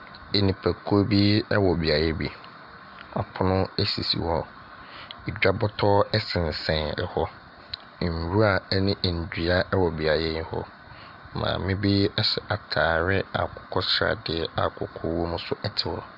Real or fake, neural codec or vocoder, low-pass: real; none; 5.4 kHz